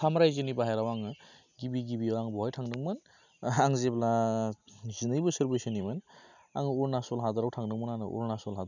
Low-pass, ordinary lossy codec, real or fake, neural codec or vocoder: 7.2 kHz; none; real; none